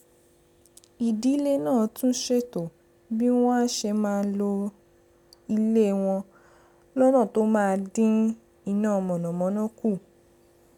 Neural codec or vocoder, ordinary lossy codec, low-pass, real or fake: none; none; 19.8 kHz; real